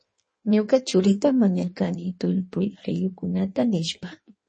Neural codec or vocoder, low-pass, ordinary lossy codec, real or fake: codec, 16 kHz in and 24 kHz out, 1.1 kbps, FireRedTTS-2 codec; 9.9 kHz; MP3, 32 kbps; fake